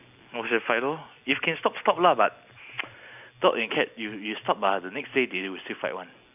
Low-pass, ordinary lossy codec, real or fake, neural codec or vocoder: 3.6 kHz; none; real; none